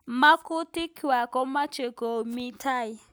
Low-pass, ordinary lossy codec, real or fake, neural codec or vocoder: none; none; real; none